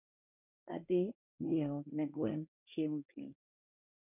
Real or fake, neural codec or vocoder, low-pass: fake; codec, 24 kHz, 1 kbps, SNAC; 3.6 kHz